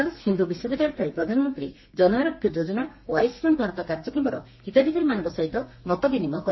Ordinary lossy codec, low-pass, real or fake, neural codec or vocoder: MP3, 24 kbps; 7.2 kHz; fake; codec, 32 kHz, 1.9 kbps, SNAC